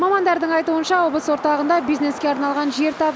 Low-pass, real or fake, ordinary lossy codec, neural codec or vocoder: none; real; none; none